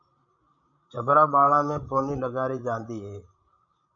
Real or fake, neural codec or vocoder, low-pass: fake; codec, 16 kHz, 16 kbps, FreqCodec, larger model; 7.2 kHz